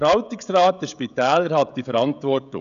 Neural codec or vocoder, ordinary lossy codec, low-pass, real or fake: codec, 16 kHz, 16 kbps, FreqCodec, smaller model; none; 7.2 kHz; fake